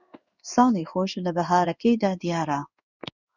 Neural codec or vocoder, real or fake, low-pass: codec, 16 kHz in and 24 kHz out, 1 kbps, XY-Tokenizer; fake; 7.2 kHz